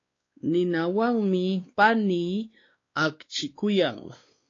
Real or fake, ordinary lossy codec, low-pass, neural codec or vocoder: fake; AAC, 32 kbps; 7.2 kHz; codec, 16 kHz, 2 kbps, X-Codec, WavLM features, trained on Multilingual LibriSpeech